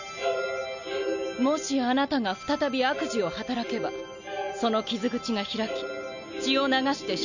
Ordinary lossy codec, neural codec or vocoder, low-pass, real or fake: none; none; 7.2 kHz; real